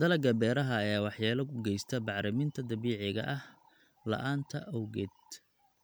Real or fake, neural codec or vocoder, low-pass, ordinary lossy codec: real; none; none; none